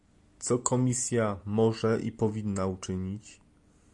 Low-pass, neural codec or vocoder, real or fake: 10.8 kHz; none; real